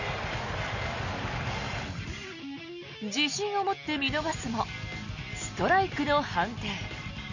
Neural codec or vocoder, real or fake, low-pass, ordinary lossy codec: none; real; 7.2 kHz; none